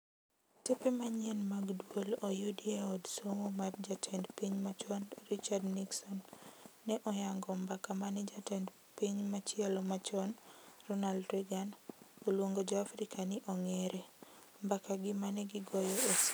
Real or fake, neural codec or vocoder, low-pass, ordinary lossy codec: real; none; none; none